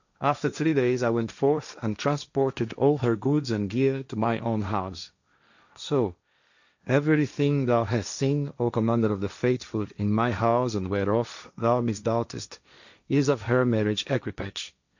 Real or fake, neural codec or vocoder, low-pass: fake; codec, 16 kHz, 1.1 kbps, Voila-Tokenizer; 7.2 kHz